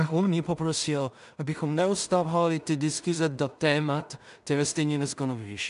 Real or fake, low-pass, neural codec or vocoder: fake; 10.8 kHz; codec, 16 kHz in and 24 kHz out, 0.4 kbps, LongCat-Audio-Codec, two codebook decoder